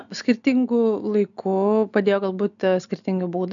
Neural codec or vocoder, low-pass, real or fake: none; 7.2 kHz; real